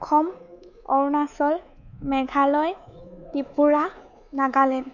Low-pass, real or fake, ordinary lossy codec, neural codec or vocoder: 7.2 kHz; fake; none; codec, 24 kHz, 3.1 kbps, DualCodec